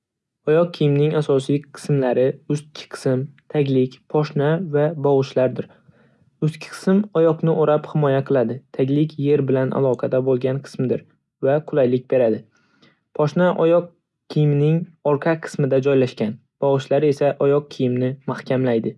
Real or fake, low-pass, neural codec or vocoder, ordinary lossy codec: real; none; none; none